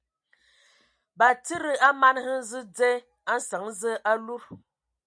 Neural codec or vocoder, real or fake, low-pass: none; real; 9.9 kHz